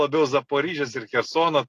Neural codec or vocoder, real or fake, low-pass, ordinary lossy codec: none; real; 14.4 kHz; AAC, 48 kbps